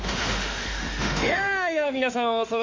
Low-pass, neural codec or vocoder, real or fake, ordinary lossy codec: 7.2 kHz; autoencoder, 48 kHz, 32 numbers a frame, DAC-VAE, trained on Japanese speech; fake; MP3, 48 kbps